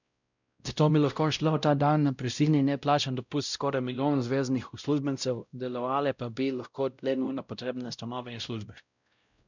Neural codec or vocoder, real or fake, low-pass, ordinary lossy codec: codec, 16 kHz, 0.5 kbps, X-Codec, WavLM features, trained on Multilingual LibriSpeech; fake; 7.2 kHz; none